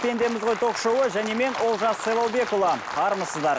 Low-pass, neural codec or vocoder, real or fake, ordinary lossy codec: none; none; real; none